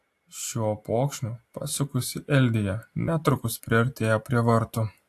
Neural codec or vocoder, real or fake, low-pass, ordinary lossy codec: none; real; 14.4 kHz; AAC, 64 kbps